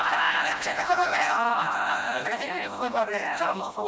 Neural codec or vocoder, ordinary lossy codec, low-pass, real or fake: codec, 16 kHz, 0.5 kbps, FreqCodec, smaller model; none; none; fake